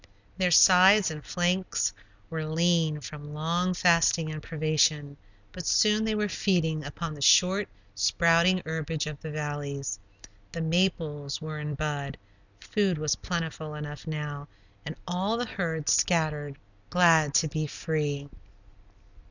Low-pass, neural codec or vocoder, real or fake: 7.2 kHz; none; real